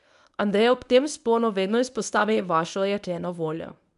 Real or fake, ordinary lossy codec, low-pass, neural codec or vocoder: fake; none; 10.8 kHz; codec, 24 kHz, 0.9 kbps, WavTokenizer, medium speech release version 1